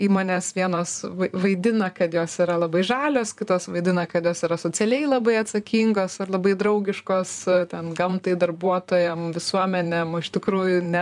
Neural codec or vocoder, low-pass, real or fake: vocoder, 44.1 kHz, 128 mel bands, Pupu-Vocoder; 10.8 kHz; fake